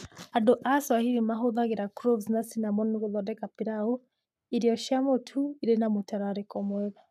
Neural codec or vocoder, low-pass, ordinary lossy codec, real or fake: codec, 44.1 kHz, 7.8 kbps, Pupu-Codec; 14.4 kHz; none; fake